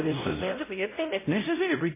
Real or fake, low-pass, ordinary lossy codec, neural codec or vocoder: fake; 3.6 kHz; MP3, 16 kbps; codec, 16 kHz, 0.5 kbps, X-Codec, WavLM features, trained on Multilingual LibriSpeech